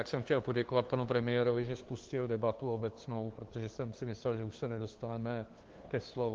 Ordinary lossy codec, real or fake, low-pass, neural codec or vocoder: Opus, 16 kbps; fake; 7.2 kHz; codec, 16 kHz, 2 kbps, FunCodec, trained on LibriTTS, 25 frames a second